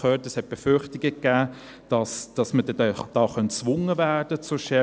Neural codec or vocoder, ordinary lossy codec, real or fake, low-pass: none; none; real; none